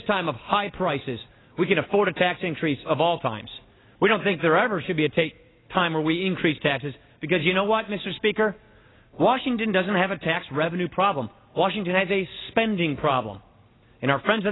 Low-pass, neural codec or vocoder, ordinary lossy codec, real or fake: 7.2 kHz; none; AAC, 16 kbps; real